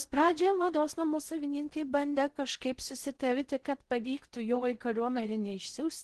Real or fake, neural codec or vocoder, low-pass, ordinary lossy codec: fake; codec, 16 kHz in and 24 kHz out, 0.6 kbps, FocalCodec, streaming, 4096 codes; 10.8 kHz; Opus, 16 kbps